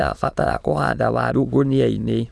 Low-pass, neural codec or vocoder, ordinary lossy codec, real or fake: none; autoencoder, 22.05 kHz, a latent of 192 numbers a frame, VITS, trained on many speakers; none; fake